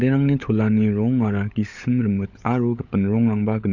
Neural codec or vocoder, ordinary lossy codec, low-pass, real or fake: codec, 16 kHz, 16 kbps, FunCodec, trained on LibriTTS, 50 frames a second; none; 7.2 kHz; fake